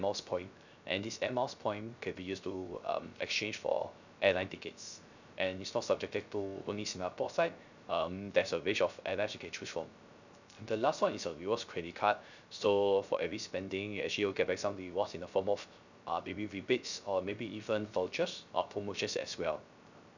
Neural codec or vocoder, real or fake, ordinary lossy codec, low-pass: codec, 16 kHz, 0.3 kbps, FocalCodec; fake; none; 7.2 kHz